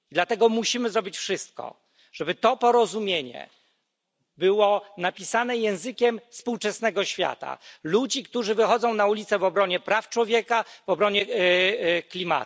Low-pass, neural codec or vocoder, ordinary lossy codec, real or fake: none; none; none; real